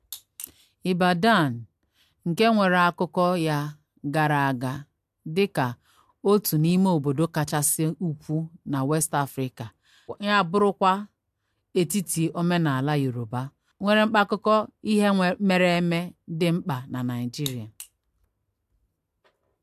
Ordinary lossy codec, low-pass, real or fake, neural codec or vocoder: AAC, 96 kbps; 14.4 kHz; real; none